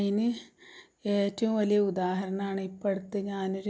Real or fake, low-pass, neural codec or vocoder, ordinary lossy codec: real; none; none; none